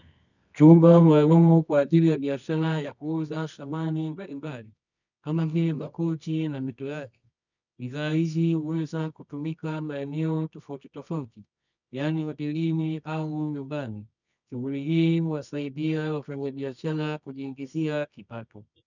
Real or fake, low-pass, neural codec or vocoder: fake; 7.2 kHz; codec, 24 kHz, 0.9 kbps, WavTokenizer, medium music audio release